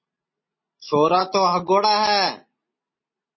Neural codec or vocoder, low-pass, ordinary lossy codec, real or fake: none; 7.2 kHz; MP3, 24 kbps; real